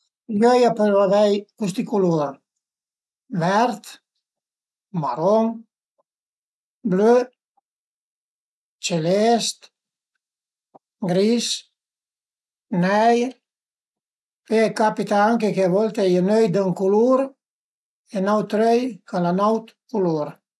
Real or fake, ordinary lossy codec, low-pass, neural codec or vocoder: real; none; none; none